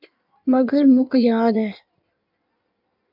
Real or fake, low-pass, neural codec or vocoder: fake; 5.4 kHz; codec, 16 kHz in and 24 kHz out, 1.1 kbps, FireRedTTS-2 codec